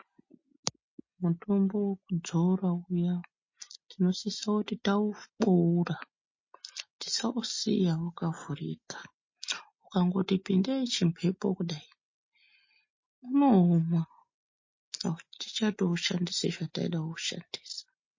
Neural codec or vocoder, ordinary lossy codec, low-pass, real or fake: none; MP3, 32 kbps; 7.2 kHz; real